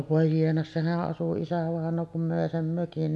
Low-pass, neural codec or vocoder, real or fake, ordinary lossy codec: none; none; real; none